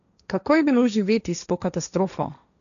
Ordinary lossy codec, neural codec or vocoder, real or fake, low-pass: none; codec, 16 kHz, 1.1 kbps, Voila-Tokenizer; fake; 7.2 kHz